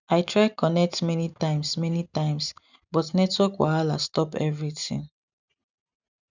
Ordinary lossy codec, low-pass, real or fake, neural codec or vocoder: none; 7.2 kHz; real; none